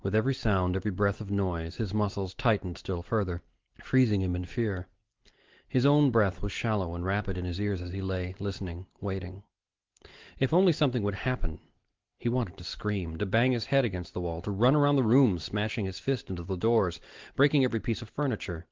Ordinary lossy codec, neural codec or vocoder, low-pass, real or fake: Opus, 16 kbps; none; 7.2 kHz; real